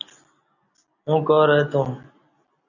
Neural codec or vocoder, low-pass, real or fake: none; 7.2 kHz; real